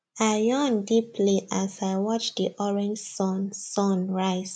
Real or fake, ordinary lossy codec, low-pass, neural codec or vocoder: real; MP3, 96 kbps; 9.9 kHz; none